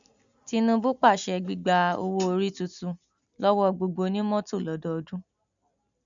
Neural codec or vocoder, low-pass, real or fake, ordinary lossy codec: none; 7.2 kHz; real; none